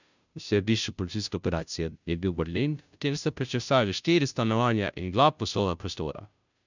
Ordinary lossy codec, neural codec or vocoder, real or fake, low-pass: none; codec, 16 kHz, 0.5 kbps, FunCodec, trained on Chinese and English, 25 frames a second; fake; 7.2 kHz